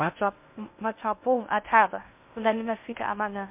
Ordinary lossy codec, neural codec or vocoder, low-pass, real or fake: MP3, 32 kbps; codec, 16 kHz in and 24 kHz out, 0.6 kbps, FocalCodec, streaming, 2048 codes; 3.6 kHz; fake